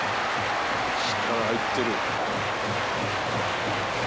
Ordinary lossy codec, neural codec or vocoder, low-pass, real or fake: none; none; none; real